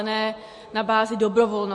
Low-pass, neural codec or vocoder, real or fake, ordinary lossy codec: 10.8 kHz; none; real; MP3, 48 kbps